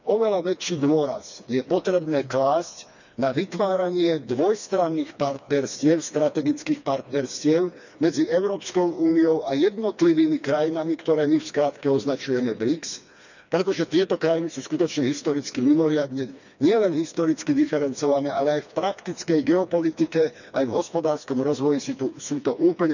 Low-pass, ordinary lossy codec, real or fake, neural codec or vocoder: 7.2 kHz; none; fake; codec, 16 kHz, 2 kbps, FreqCodec, smaller model